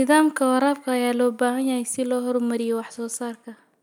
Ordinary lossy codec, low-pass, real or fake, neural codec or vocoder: none; none; real; none